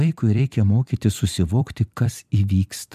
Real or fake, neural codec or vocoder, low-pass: fake; vocoder, 44.1 kHz, 128 mel bands every 256 samples, BigVGAN v2; 14.4 kHz